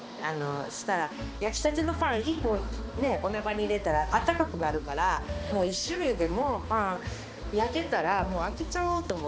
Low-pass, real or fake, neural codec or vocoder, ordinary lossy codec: none; fake; codec, 16 kHz, 2 kbps, X-Codec, HuBERT features, trained on balanced general audio; none